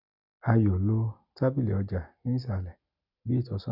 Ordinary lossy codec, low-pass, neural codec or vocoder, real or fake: none; 5.4 kHz; none; real